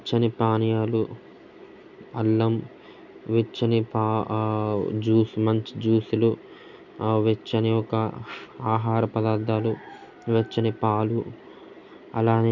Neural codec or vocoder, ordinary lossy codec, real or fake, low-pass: none; none; real; 7.2 kHz